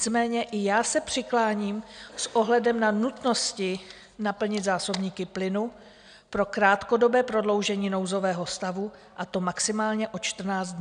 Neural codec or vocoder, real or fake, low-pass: none; real; 9.9 kHz